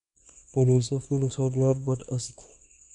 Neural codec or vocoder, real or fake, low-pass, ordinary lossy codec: codec, 24 kHz, 0.9 kbps, WavTokenizer, small release; fake; 10.8 kHz; none